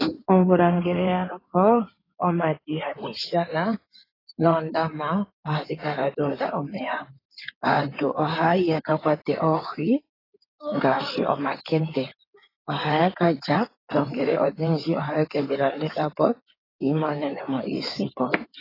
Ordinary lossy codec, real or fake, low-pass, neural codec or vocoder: AAC, 24 kbps; fake; 5.4 kHz; codec, 16 kHz in and 24 kHz out, 2.2 kbps, FireRedTTS-2 codec